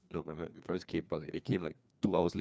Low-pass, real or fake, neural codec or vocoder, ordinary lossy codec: none; fake; codec, 16 kHz, 2 kbps, FreqCodec, larger model; none